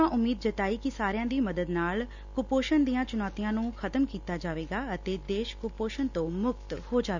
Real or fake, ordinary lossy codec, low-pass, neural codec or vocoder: real; none; 7.2 kHz; none